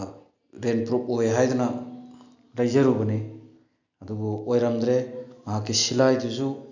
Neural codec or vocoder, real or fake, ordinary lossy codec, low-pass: none; real; none; 7.2 kHz